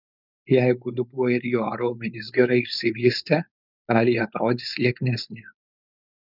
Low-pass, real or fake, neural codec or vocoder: 5.4 kHz; fake; codec, 16 kHz, 4.8 kbps, FACodec